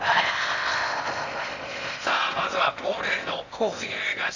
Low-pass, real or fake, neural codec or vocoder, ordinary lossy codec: 7.2 kHz; fake; codec, 16 kHz in and 24 kHz out, 0.6 kbps, FocalCodec, streaming, 4096 codes; Opus, 64 kbps